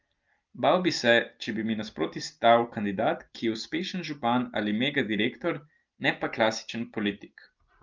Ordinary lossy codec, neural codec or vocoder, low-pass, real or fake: Opus, 32 kbps; none; 7.2 kHz; real